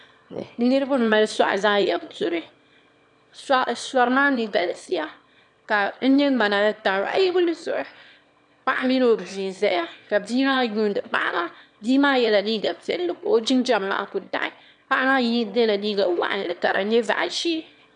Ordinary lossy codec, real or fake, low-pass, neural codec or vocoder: MP3, 96 kbps; fake; 9.9 kHz; autoencoder, 22.05 kHz, a latent of 192 numbers a frame, VITS, trained on one speaker